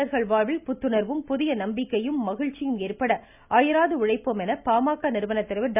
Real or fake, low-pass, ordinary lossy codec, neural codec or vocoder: real; 3.6 kHz; none; none